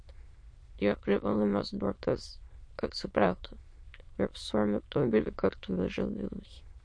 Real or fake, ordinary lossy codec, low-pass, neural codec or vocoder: fake; MP3, 48 kbps; 9.9 kHz; autoencoder, 22.05 kHz, a latent of 192 numbers a frame, VITS, trained on many speakers